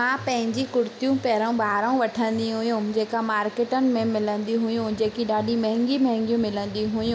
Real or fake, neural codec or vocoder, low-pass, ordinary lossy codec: real; none; none; none